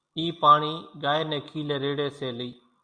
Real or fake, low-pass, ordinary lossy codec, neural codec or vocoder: real; 9.9 kHz; Opus, 64 kbps; none